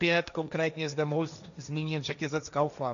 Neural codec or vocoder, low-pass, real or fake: codec, 16 kHz, 1.1 kbps, Voila-Tokenizer; 7.2 kHz; fake